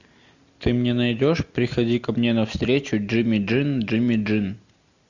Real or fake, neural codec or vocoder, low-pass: real; none; 7.2 kHz